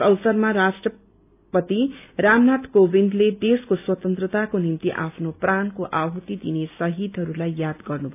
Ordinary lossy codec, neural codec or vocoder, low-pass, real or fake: none; none; 3.6 kHz; real